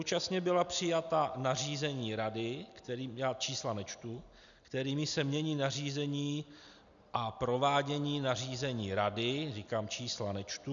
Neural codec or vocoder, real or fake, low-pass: none; real; 7.2 kHz